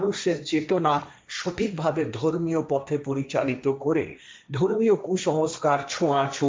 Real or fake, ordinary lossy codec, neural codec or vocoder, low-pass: fake; none; codec, 16 kHz, 1.1 kbps, Voila-Tokenizer; none